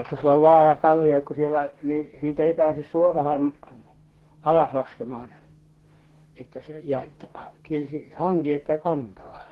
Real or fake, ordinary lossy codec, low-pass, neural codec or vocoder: fake; Opus, 16 kbps; 19.8 kHz; codec, 44.1 kHz, 2.6 kbps, DAC